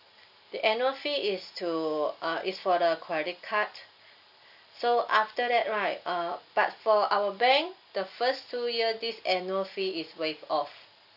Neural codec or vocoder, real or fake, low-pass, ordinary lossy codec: none; real; 5.4 kHz; AAC, 48 kbps